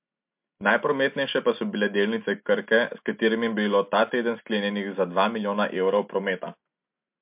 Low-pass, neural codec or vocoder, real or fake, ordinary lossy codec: 3.6 kHz; none; real; MP3, 32 kbps